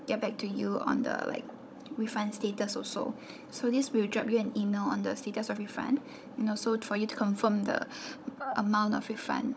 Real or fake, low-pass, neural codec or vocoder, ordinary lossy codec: fake; none; codec, 16 kHz, 16 kbps, FunCodec, trained on Chinese and English, 50 frames a second; none